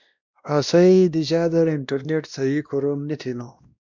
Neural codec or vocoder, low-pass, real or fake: codec, 16 kHz, 1 kbps, X-Codec, WavLM features, trained on Multilingual LibriSpeech; 7.2 kHz; fake